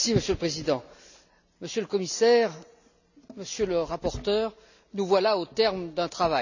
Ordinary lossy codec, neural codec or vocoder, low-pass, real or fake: none; none; 7.2 kHz; real